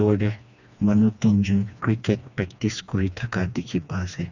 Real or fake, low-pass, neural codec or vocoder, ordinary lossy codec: fake; 7.2 kHz; codec, 16 kHz, 2 kbps, FreqCodec, smaller model; none